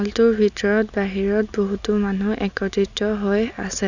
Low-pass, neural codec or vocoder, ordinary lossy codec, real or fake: 7.2 kHz; none; none; real